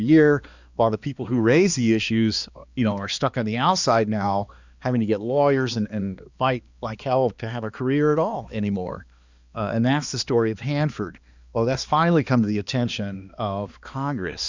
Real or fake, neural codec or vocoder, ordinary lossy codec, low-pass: fake; codec, 16 kHz, 2 kbps, X-Codec, HuBERT features, trained on balanced general audio; Opus, 64 kbps; 7.2 kHz